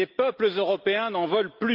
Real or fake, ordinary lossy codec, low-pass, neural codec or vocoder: real; Opus, 32 kbps; 5.4 kHz; none